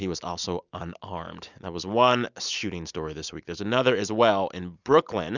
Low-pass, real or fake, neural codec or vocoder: 7.2 kHz; real; none